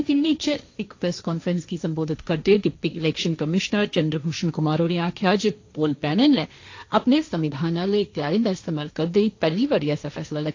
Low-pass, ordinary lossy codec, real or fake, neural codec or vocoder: 7.2 kHz; AAC, 48 kbps; fake; codec, 16 kHz, 1.1 kbps, Voila-Tokenizer